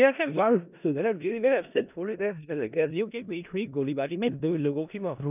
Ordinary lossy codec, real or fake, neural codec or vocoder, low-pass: none; fake; codec, 16 kHz in and 24 kHz out, 0.4 kbps, LongCat-Audio-Codec, four codebook decoder; 3.6 kHz